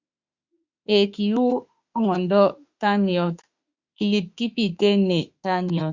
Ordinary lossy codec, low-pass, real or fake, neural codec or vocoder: Opus, 64 kbps; 7.2 kHz; fake; autoencoder, 48 kHz, 32 numbers a frame, DAC-VAE, trained on Japanese speech